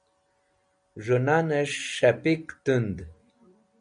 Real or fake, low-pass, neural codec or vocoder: real; 9.9 kHz; none